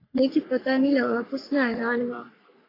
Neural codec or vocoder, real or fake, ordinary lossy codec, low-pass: codec, 44.1 kHz, 3.4 kbps, Pupu-Codec; fake; AAC, 24 kbps; 5.4 kHz